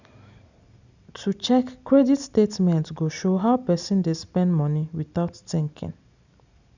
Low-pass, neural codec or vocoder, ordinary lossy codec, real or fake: 7.2 kHz; none; none; real